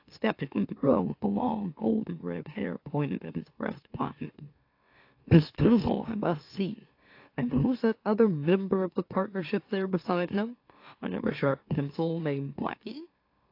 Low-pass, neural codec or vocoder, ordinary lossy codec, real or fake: 5.4 kHz; autoencoder, 44.1 kHz, a latent of 192 numbers a frame, MeloTTS; AAC, 32 kbps; fake